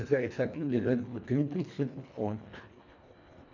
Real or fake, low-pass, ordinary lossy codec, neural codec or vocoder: fake; 7.2 kHz; none; codec, 24 kHz, 1.5 kbps, HILCodec